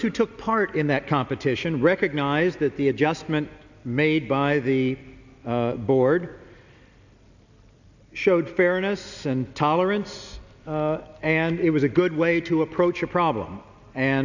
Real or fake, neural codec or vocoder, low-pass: real; none; 7.2 kHz